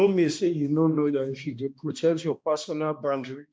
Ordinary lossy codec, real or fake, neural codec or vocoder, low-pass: none; fake; codec, 16 kHz, 1 kbps, X-Codec, HuBERT features, trained on balanced general audio; none